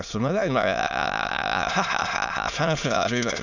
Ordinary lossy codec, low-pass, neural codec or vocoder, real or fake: none; 7.2 kHz; autoencoder, 22.05 kHz, a latent of 192 numbers a frame, VITS, trained on many speakers; fake